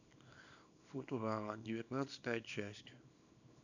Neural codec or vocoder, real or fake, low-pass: codec, 24 kHz, 0.9 kbps, WavTokenizer, small release; fake; 7.2 kHz